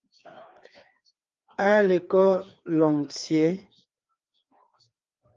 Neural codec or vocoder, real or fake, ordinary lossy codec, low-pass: codec, 16 kHz, 2 kbps, FreqCodec, larger model; fake; Opus, 32 kbps; 7.2 kHz